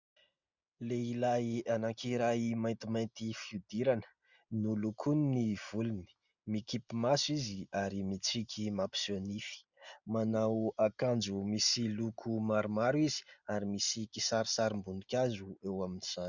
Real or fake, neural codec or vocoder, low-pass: real; none; 7.2 kHz